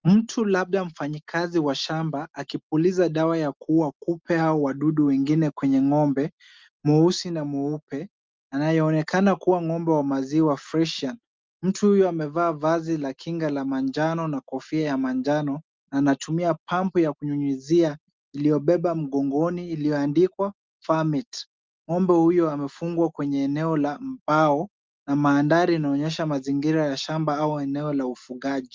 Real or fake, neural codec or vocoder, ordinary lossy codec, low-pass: real; none; Opus, 24 kbps; 7.2 kHz